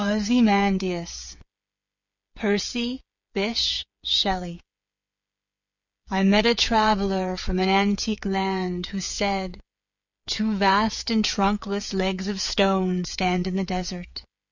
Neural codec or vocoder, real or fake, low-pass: codec, 16 kHz, 8 kbps, FreqCodec, smaller model; fake; 7.2 kHz